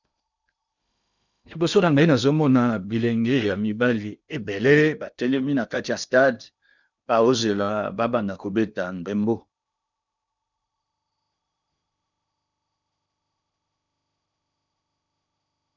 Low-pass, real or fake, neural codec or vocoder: 7.2 kHz; fake; codec, 16 kHz in and 24 kHz out, 0.8 kbps, FocalCodec, streaming, 65536 codes